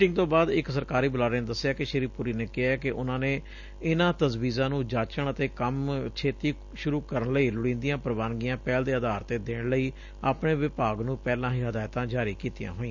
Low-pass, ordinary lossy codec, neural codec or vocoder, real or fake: 7.2 kHz; none; none; real